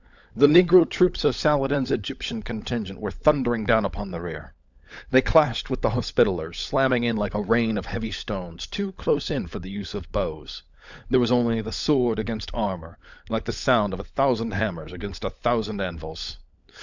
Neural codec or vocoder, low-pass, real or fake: codec, 16 kHz, 16 kbps, FunCodec, trained on LibriTTS, 50 frames a second; 7.2 kHz; fake